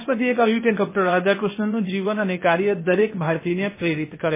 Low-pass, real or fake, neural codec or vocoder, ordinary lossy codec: 3.6 kHz; fake; codec, 16 kHz, 0.8 kbps, ZipCodec; MP3, 16 kbps